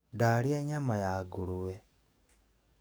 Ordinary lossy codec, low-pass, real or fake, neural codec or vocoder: none; none; fake; codec, 44.1 kHz, 7.8 kbps, DAC